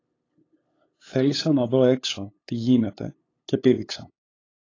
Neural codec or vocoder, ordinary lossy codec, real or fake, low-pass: codec, 16 kHz, 8 kbps, FunCodec, trained on LibriTTS, 25 frames a second; AAC, 32 kbps; fake; 7.2 kHz